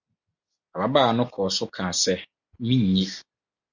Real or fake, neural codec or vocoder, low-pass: real; none; 7.2 kHz